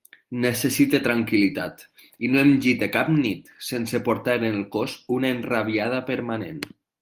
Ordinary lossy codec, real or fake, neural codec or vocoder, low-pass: Opus, 32 kbps; real; none; 14.4 kHz